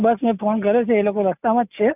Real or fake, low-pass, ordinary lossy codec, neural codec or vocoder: real; 3.6 kHz; none; none